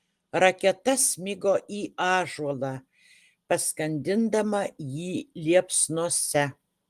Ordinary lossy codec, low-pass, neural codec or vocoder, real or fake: Opus, 24 kbps; 14.4 kHz; none; real